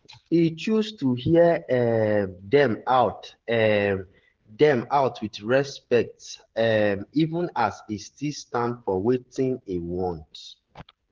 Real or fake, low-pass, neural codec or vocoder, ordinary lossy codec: fake; 7.2 kHz; codec, 16 kHz, 16 kbps, FreqCodec, smaller model; Opus, 16 kbps